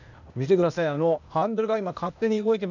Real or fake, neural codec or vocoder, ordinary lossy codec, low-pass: fake; codec, 16 kHz, 0.8 kbps, ZipCodec; none; 7.2 kHz